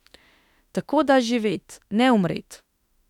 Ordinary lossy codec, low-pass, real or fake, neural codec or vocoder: none; 19.8 kHz; fake; autoencoder, 48 kHz, 32 numbers a frame, DAC-VAE, trained on Japanese speech